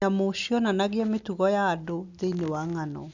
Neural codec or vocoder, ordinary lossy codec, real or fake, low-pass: none; none; real; 7.2 kHz